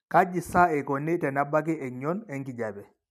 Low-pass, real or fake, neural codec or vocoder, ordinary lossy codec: 14.4 kHz; real; none; none